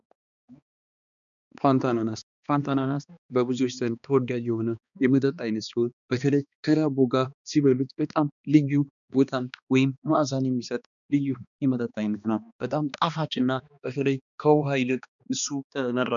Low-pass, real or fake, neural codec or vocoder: 7.2 kHz; fake; codec, 16 kHz, 2 kbps, X-Codec, HuBERT features, trained on balanced general audio